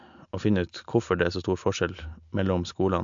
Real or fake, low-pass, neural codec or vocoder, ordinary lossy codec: real; 7.2 kHz; none; MP3, 64 kbps